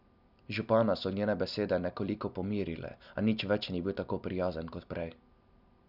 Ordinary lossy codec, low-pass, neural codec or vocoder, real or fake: none; 5.4 kHz; none; real